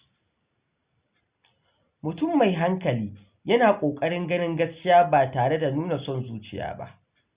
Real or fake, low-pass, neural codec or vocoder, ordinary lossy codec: real; 3.6 kHz; none; Opus, 64 kbps